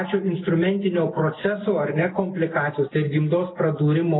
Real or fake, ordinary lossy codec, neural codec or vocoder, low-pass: real; AAC, 16 kbps; none; 7.2 kHz